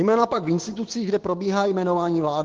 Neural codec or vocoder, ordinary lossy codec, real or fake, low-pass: codec, 16 kHz, 6 kbps, DAC; Opus, 16 kbps; fake; 7.2 kHz